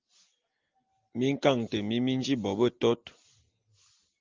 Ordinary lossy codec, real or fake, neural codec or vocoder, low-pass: Opus, 32 kbps; real; none; 7.2 kHz